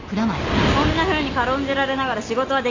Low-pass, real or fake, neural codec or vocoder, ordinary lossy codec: 7.2 kHz; real; none; AAC, 48 kbps